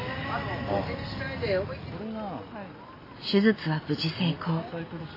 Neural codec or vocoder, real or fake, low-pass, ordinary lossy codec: none; real; 5.4 kHz; AAC, 24 kbps